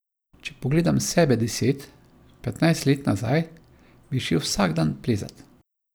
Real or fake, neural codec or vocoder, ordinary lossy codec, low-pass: real; none; none; none